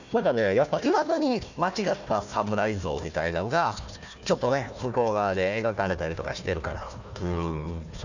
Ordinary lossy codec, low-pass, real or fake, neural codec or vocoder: none; 7.2 kHz; fake; codec, 16 kHz, 1 kbps, FunCodec, trained on Chinese and English, 50 frames a second